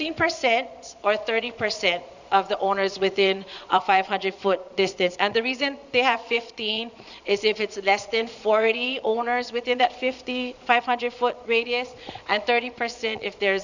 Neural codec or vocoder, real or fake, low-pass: vocoder, 22.05 kHz, 80 mel bands, Vocos; fake; 7.2 kHz